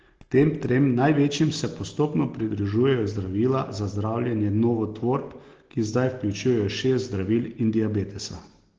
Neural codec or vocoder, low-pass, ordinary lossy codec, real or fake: none; 7.2 kHz; Opus, 16 kbps; real